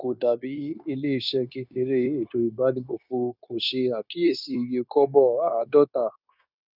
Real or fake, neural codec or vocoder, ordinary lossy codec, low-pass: fake; codec, 16 kHz, 0.9 kbps, LongCat-Audio-Codec; none; 5.4 kHz